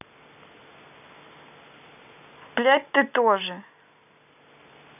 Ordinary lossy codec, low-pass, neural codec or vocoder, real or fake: none; 3.6 kHz; none; real